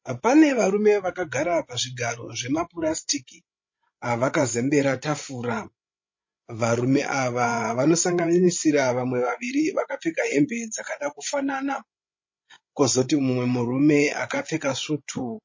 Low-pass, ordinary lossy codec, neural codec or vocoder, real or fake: 7.2 kHz; MP3, 32 kbps; codec, 16 kHz, 16 kbps, FreqCodec, larger model; fake